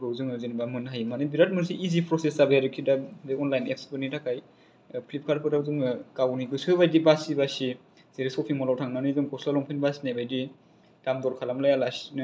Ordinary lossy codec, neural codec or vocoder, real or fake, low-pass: none; none; real; none